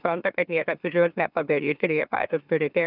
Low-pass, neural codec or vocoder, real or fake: 5.4 kHz; autoencoder, 44.1 kHz, a latent of 192 numbers a frame, MeloTTS; fake